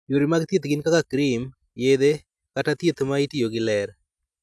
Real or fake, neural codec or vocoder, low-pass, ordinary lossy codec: real; none; none; none